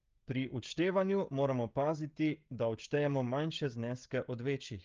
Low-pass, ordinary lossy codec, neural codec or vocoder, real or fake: 7.2 kHz; Opus, 24 kbps; codec, 16 kHz, 16 kbps, FreqCodec, smaller model; fake